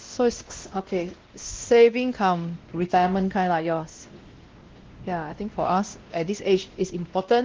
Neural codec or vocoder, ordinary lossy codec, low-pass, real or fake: codec, 16 kHz, 1 kbps, X-Codec, WavLM features, trained on Multilingual LibriSpeech; Opus, 16 kbps; 7.2 kHz; fake